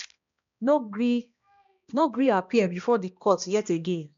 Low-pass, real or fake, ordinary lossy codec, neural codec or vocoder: 7.2 kHz; fake; MP3, 64 kbps; codec, 16 kHz, 1 kbps, X-Codec, HuBERT features, trained on balanced general audio